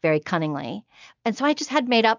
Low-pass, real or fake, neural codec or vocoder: 7.2 kHz; real; none